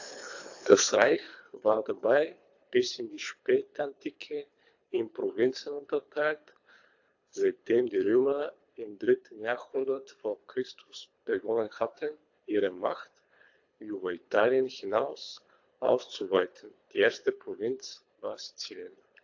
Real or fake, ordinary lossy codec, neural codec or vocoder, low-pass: fake; AAC, 48 kbps; codec, 24 kHz, 3 kbps, HILCodec; 7.2 kHz